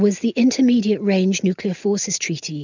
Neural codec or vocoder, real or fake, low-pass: none; real; 7.2 kHz